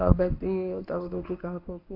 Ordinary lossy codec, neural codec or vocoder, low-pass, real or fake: AAC, 48 kbps; codec, 24 kHz, 0.9 kbps, WavTokenizer, medium speech release version 1; 5.4 kHz; fake